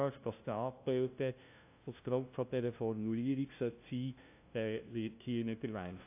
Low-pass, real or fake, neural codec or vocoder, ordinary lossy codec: 3.6 kHz; fake; codec, 16 kHz, 0.5 kbps, FunCodec, trained on LibriTTS, 25 frames a second; AAC, 32 kbps